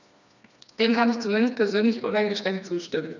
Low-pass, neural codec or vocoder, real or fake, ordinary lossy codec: 7.2 kHz; codec, 16 kHz, 2 kbps, FreqCodec, smaller model; fake; none